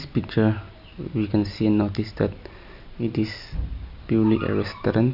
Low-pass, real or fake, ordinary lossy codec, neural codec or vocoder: 5.4 kHz; real; none; none